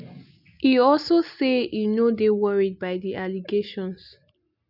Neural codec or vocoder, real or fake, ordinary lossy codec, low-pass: none; real; none; 5.4 kHz